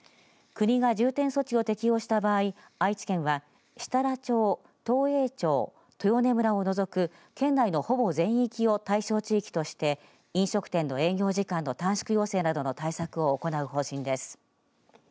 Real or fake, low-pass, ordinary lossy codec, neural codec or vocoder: real; none; none; none